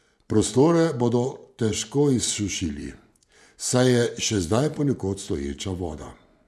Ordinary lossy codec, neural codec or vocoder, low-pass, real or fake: none; vocoder, 24 kHz, 100 mel bands, Vocos; none; fake